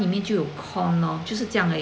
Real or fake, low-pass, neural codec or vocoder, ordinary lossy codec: real; none; none; none